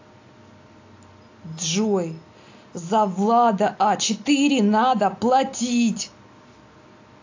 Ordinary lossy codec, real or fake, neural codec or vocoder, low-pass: AAC, 48 kbps; real; none; 7.2 kHz